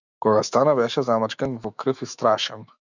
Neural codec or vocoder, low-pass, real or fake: autoencoder, 48 kHz, 128 numbers a frame, DAC-VAE, trained on Japanese speech; 7.2 kHz; fake